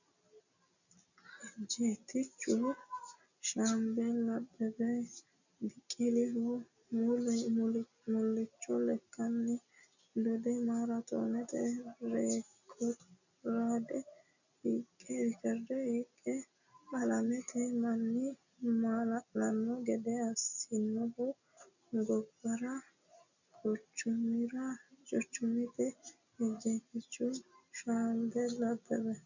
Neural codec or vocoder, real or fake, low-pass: none; real; 7.2 kHz